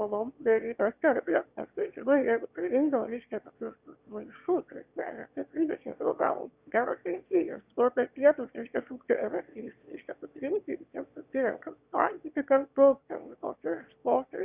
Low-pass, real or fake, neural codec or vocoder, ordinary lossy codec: 3.6 kHz; fake; autoencoder, 22.05 kHz, a latent of 192 numbers a frame, VITS, trained on one speaker; Opus, 64 kbps